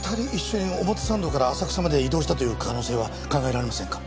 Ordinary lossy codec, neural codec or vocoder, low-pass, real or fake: none; none; none; real